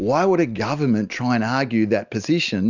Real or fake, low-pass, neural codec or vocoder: real; 7.2 kHz; none